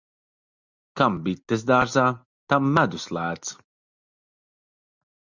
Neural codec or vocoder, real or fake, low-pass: none; real; 7.2 kHz